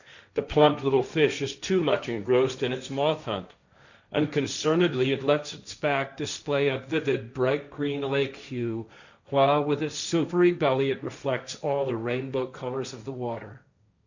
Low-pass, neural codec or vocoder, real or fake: 7.2 kHz; codec, 16 kHz, 1.1 kbps, Voila-Tokenizer; fake